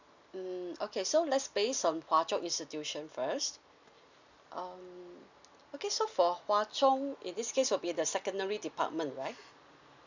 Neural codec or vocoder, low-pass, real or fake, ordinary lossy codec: none; 7.2 kHz; real; none